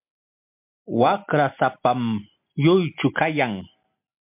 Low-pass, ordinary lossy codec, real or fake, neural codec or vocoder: 3.6 kHz; MP3, 32 kbps; real; none